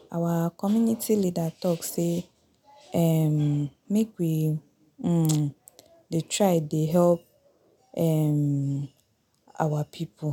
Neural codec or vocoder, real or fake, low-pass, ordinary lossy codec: none; real; 19.8 kHz; none